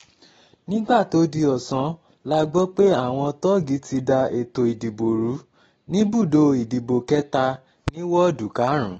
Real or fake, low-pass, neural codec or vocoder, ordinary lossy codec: real; 19.8 kHz; none; AAC, 24 kbps